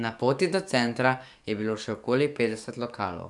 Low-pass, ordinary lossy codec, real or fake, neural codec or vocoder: 10.8 kHz; none; fake; codec, 44.1 kHz, 7.8 kbps, DAC